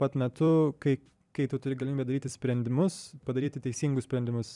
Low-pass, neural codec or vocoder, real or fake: 10.8 kHz; vocoder, 44.1 kHz, 128 mel bands every 256 samples, BigVGAN v2; fake